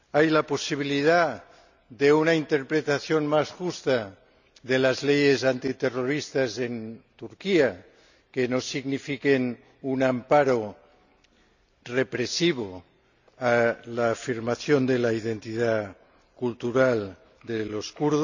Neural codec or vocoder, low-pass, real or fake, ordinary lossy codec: none; 7.2 kHz; real; none